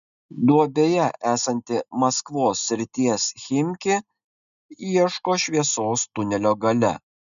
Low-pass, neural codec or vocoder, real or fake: 7.2 kHz; none; real